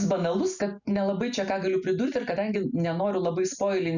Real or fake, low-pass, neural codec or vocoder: real; 7.2 kHz; none